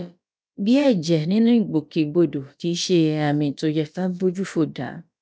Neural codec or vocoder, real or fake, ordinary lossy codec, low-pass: codec, 16 kHz, about 1 kbps, DyCAST, with the encoder's durations; fake; none; none